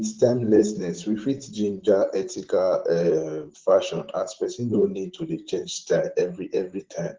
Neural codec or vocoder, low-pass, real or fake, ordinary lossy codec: vocoder, 44.1 kHz, 128 mel bands, Pupu-Vocoder; 7.2 kHz; fake; Opus, 16 kbps